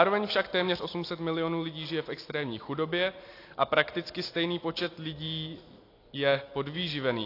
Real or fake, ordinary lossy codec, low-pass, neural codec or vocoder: real; AAC, 32 kbps; 5.4 kHz; none